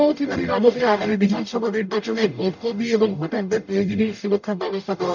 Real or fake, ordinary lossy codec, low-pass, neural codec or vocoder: fake; none; 7.2 kHz; codec, 44.1 kHz, 0.9 kbps, DAC